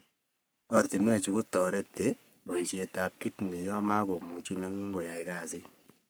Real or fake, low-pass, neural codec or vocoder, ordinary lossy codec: fake; none; codec, 44.1 kHz, 3.4 kbps, Pupu-Codec; none